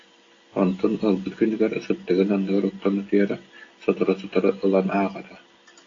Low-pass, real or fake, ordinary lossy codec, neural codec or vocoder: 7.2 kHz; real; AAC, 32 kbps; none